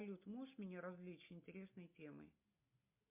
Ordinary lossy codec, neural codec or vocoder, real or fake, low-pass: MP3, 32 kbps; none; real; 3.6 kHz